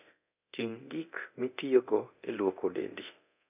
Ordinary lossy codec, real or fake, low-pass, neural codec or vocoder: none; fake; 3.6 kHz; codec, 24 kHz, 0.9 kbps, DualCodec